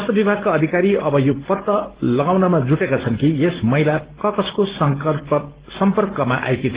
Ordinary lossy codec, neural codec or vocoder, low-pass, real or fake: Opus, 16 kbps; none; 3.6 kHz; real